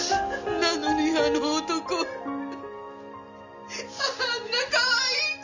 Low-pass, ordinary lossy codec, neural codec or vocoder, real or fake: 7.2 kHz; none; none; real